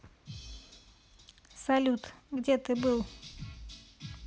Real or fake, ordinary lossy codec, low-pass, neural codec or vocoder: real; none; none; none